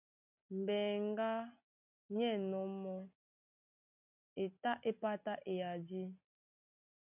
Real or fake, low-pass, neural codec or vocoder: real; 3.6 kHz; none